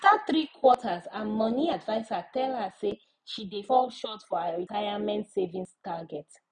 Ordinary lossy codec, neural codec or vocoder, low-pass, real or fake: none; none; 9.9 kHz; real